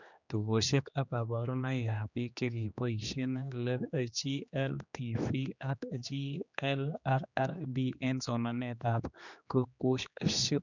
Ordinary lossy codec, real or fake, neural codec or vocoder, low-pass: none; fake; codec, 16 kHz, 2 kbps, X-Codec, HuBERT features, trained on general audio; 7.2 kHz